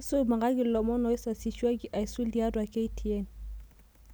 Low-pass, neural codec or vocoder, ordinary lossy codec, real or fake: none; none; none; real